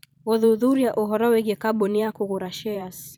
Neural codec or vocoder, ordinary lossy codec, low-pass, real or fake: vocoder, 44.1 kHz, 128 mel bands every 512 samples, BigVGAN v2; none; none; fake